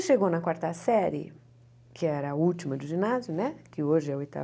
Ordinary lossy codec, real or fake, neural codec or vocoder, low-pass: none; real; none; none